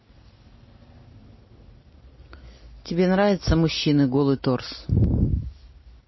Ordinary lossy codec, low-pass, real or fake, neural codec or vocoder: MP3, 24 kbps; 7.2 kHz; real; none